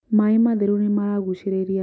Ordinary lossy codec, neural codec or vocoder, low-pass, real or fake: none; none; none; real